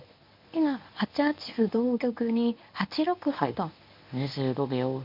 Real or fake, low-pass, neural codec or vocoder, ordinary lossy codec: fake; 5.4 kHz; codec, 24 kHz, 0.9 kbps, WavTokenizer, medium speech release version 1; MP3, 48 kbps